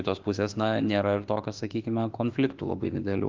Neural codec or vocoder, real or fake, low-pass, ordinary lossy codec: autoencoder, 48 kHz, 32 numbers a frame, DAC-VAE, trained on Japanese speech; fake; 7.2 kHz; Opus, 32 kbps